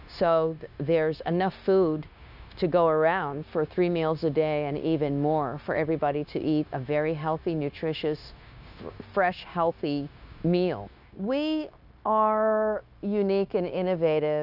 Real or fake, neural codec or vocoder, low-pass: fake; codec, 16 kHz, 0.9 kbps, LongCat-Audio-Codec; 5.4 kHz